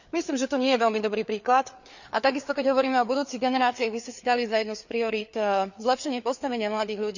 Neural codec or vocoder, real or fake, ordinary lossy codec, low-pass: codec, 16 kHz, 4 kbps, FreqCodec, larger model; fake; none; 7.2 kHz